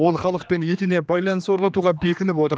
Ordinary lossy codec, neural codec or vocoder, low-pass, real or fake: none; codec, 16 kHz, 2 kbps, X-Codec, HuBERT features, trained on general audio; none; fake